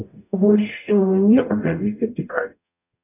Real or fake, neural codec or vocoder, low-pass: fake; codec, 44.1 kHz, 0.9 kbps, DAC; 3.6 kHz